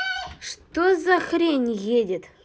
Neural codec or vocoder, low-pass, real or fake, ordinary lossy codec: none; none; real; none